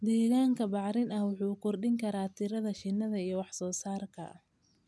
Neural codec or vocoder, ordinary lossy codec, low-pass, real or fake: none; none; none; real